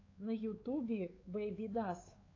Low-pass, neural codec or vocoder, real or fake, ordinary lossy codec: 7.2 kHz; codec, 16 kHz, 4 kbps, X-Codec, WavLM features, trained on Multilingual LibriSpeech; fake; AAC, 32 kbps